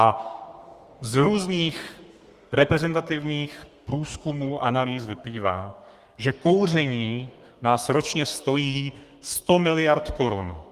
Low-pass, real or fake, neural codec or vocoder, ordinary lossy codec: 14.4 kHz; fake; codec, 32 kHz, 1.9 kbps, SNAC; Opus, 32 kbps